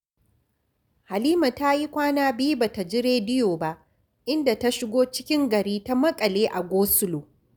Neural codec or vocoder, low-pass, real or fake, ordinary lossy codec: none; none; real; none